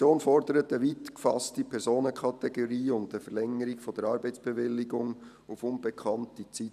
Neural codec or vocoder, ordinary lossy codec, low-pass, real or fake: vocoder, 44.1 kHz, 128 mel bands every 512 samples, BigVGAN v2; none; 14.4 kHz; fake